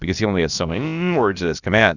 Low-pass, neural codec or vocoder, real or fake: 7.2 kHz; codec, 16 kHz, about 1 kbps, DyCAST, with the encoder's durations; fake